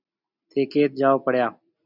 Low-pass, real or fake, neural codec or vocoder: 5.4 kHz; real; none